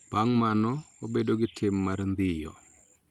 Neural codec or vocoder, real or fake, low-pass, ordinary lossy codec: none; real; 19.8 kHz; Opus, 32 kbps